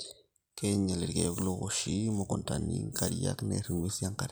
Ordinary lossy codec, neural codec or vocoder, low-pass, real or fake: none; none; none; real